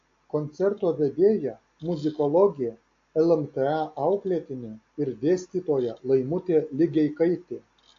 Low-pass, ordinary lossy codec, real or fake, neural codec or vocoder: 7.2 kHz; AAC, 48 kbps; real; none